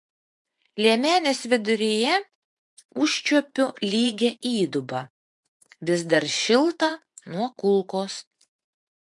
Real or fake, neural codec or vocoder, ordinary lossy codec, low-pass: fake; vocoder, 24 kHz, 100 mel bands, Vocos; MP3, 64 kbps; 10.8 kHz